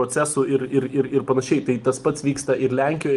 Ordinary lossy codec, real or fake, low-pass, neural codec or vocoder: Opus, 32 kbps; real; 10.8 kHz; none